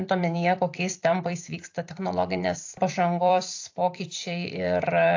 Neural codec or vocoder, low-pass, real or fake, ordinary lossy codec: vocoder, 44.1 kHz, 128 mel bands every 256 samples, BigVGAN v2; 7.2 kHz; fake; AAC, 48 kbps